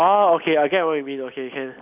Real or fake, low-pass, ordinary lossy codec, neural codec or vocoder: real; 3.6 kHz; none; none